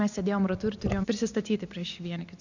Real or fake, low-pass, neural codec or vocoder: real; 7.2 kHz; none